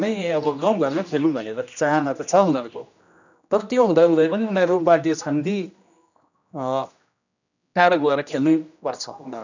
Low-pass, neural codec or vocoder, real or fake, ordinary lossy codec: 7.2 kHz; codec, 16 kHz, 1 kbps, X-Codec, HuBERT features, trained on general audio; fake; none